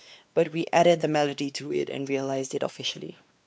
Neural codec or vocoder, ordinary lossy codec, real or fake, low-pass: codec, 16 kHz, 2 kbps, X-Codec, WavLM features, trained on Multilingual LibriSpeech; none; fake; none